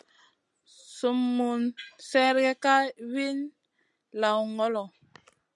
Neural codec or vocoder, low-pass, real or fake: none; 10.8 kHz; real